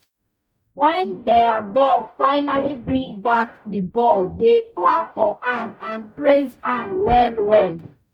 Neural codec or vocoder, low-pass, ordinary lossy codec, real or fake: codec, 44.1 kHz, 0.9 kbps, DAC; 19.8 kHz; none; fake